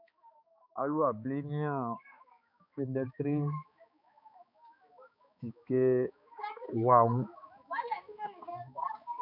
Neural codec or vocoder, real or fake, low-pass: codec, 16 kHz, 4 kbps, X-Codec, HuBERT features, trained on balanced general audio; fake; 5.4 kHz